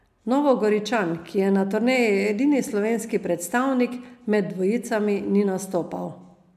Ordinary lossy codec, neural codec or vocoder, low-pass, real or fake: MP3, 96 kbps; none; 14.4 kHz; real